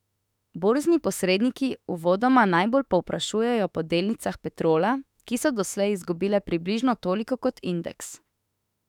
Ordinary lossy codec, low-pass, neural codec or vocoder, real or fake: none; 19.8 kHz; autoencoder, 48 kHz, 32 numbers a frame, DAC-VAE, trained on Japanese speech; fake